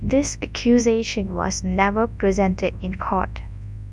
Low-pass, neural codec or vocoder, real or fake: 10.8 kHz; codec, 24 kHz, 0.9 kbps, WavTokenizer, large speech release; fake